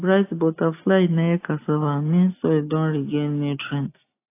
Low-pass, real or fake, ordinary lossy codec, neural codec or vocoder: 3.6 kHz; real; AAC, 24 kbps; none